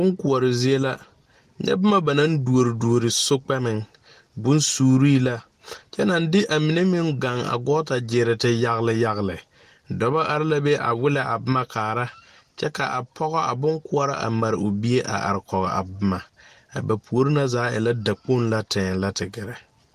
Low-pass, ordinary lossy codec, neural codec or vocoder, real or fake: 14.4 kHz; Opus, 24 kbps; none; real